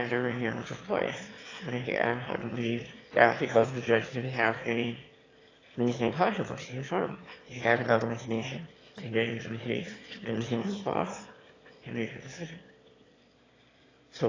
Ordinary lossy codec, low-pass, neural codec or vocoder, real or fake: AAC, 32 kbps; 7.2 kHz; autoencoder, 22.05 kHz, a latent of 192 numbers a frame, VITS, trained on one speaker; fake